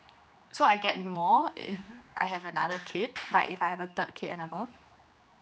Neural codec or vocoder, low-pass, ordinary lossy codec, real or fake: codec, 16 kHz, 2 kbps, X-Codec, HuBERT features, trained on general audio; none; none; fake